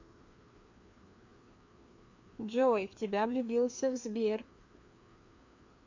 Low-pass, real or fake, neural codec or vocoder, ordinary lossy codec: 7.2 kHz; fake; codec, 16 kHz, 2 kbps, FreqCodec, larger model; MP3, 48 kbps